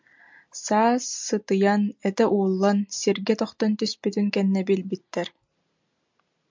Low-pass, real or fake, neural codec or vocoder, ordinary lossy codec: 7.2 kHz; real; none; MP3, 64 kbps